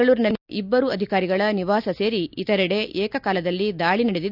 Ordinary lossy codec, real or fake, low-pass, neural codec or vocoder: none; real; 5.4 kHz; none